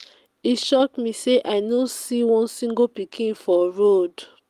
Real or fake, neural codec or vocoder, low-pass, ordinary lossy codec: real; none; 14.4 kHz; Opus, 32 kbps